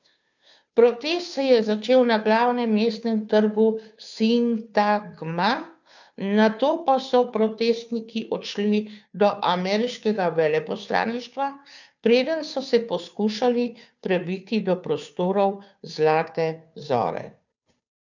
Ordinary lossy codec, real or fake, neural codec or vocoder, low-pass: none; fake; codec, 16 kHz, 2 kbps, FunCodec, trained on Chinese and English, 25 frames a second; 7.2 kHz